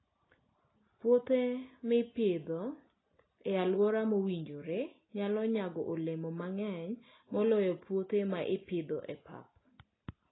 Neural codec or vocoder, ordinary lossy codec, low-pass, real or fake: none; AAC, 16 kbps; 7.2 kHz; real